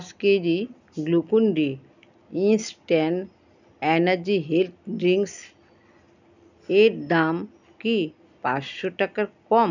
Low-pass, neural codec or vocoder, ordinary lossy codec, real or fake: 7.2 kHz; none; none; real